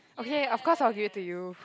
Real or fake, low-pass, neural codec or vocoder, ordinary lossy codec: real; none; none; none